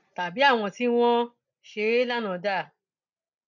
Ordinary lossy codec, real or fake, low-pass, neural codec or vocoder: none; real; 7.2 kHz; none